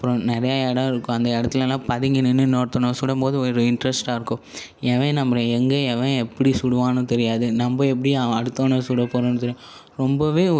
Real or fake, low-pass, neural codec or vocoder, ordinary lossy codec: real; none; none; none